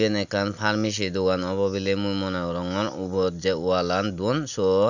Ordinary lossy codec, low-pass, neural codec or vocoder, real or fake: none; 7.2 kHz; none; real